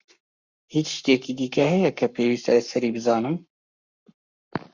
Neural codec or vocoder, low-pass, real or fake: codec, 44.1 kHz, 7.8 kbps, Pupu-Codec; 7.2 kHz; fake